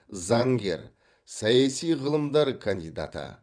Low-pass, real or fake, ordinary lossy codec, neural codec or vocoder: 9.9 kHz; fake; none; vocoder, 22.05 kHz, 80 mel bands, WaveNeXt